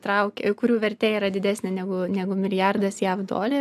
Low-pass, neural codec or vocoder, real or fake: 14.4 kHz; none; real